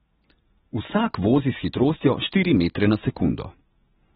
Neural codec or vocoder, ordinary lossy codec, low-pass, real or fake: none; AAC, 16 kbps; 14.4 kHz; real